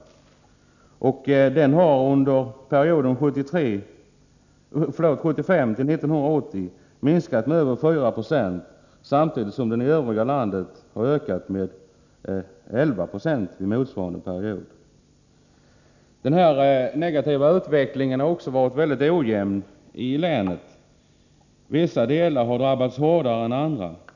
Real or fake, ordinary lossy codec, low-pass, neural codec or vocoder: real; none; 7.2 kHz; none